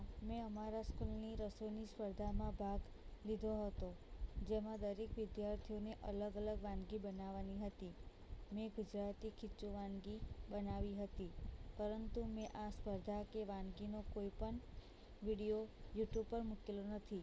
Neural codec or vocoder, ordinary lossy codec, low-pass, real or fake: none; none; none; real